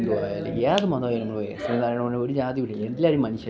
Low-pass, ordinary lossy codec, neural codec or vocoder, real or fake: none; none; none; real